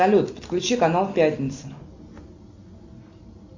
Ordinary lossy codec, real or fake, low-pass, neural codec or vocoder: MP3, 48 kbps; real; 7.2 kHz; none